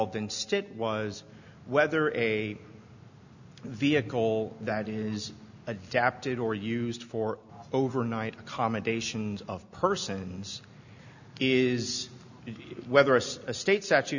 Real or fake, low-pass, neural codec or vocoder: real; 7.2 kHz; none